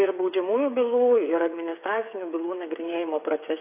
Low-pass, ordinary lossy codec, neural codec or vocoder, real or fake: 3.6 kHz; AAC, 24 kbps; codec, 16 kHz, 8 kbps, FreqCodec, smaller model; fake